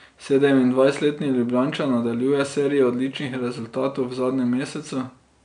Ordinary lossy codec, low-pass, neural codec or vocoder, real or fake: none; 9.9 kHz; none; real